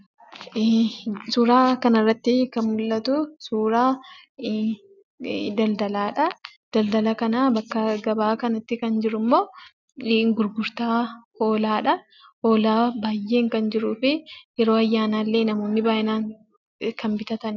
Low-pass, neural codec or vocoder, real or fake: 7.2 kHz; none; real